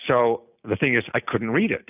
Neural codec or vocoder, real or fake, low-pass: none; real; 3.6 kHz